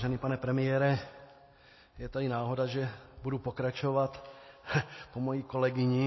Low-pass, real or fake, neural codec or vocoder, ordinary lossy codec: 7.2 kHz; real; none; MP3, 24 kbps